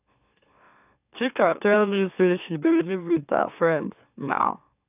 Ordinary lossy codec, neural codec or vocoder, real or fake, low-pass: none; autoencoder, 44.1 kHz, a latent of 192 numbers a frame, MeloTTS; fake; 3.6 kHz